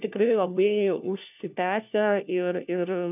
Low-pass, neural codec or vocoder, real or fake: 3.6 kHz; codec, 16 kHz, 1 kbps, FunCodec, trained on LibriTTS, 50 frames a second; fake